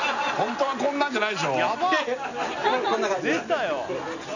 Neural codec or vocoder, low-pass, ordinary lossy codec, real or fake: none; 7.2 kHz; none; real